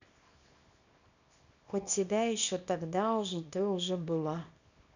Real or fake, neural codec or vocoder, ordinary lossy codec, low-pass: fake; codec, 24 kHz, 0.9 kbps, WavTokenizer, small release; none; 7.2 kHz